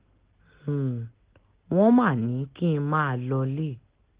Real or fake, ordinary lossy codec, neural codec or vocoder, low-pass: real; Opus, 16 kbps; none; 3.6 kHz